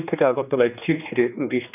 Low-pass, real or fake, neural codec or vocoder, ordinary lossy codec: 3.6 kHz; fake; codec, 16 kHz, 2 kbps, X-Codec, HuBERT features, trained on general audio; none